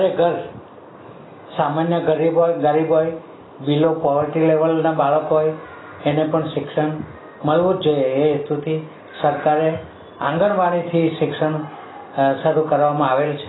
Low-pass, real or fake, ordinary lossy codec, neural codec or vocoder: 7.2 kHz; real; AAC, 16 kbps; none